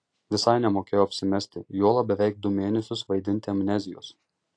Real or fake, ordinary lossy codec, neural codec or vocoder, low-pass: real; AAC, 48 kbps; none; 9.9 kHz